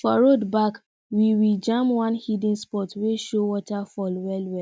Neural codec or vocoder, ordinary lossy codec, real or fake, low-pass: none; none; real; none